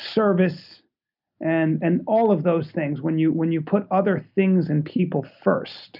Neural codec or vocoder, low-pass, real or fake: none; 5.4 kHz; real